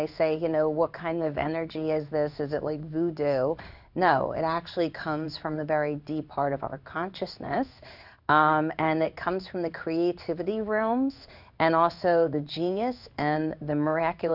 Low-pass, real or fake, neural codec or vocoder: 5.4 kHz; fake; codec, 16 kHz in and 24 kHz out, 1 kbps, XY-Tokenizer